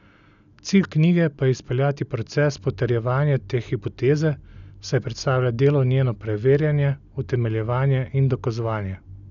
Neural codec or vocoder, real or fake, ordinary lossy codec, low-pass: none; real; none; 7.2 kHz